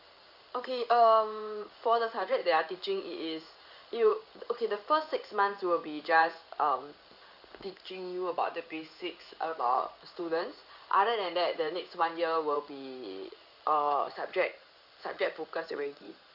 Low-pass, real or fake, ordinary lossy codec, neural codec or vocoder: 5.4 kHz; fake; none; codec, 16 kHz in and 24 kHz out, 1 kbps, XY-Tokenizer